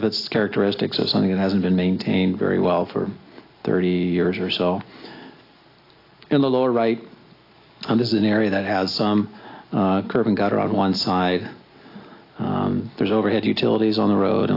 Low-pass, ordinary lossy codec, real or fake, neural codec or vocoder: 5.4 kHz; AAC, 32 kbps; real; none